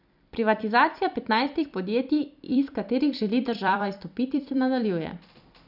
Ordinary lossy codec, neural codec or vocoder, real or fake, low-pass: none; vocoder, 44.1 kHz, 128 mel bands every 256 samples, BigVGAN v2; fake; 5.4 kHz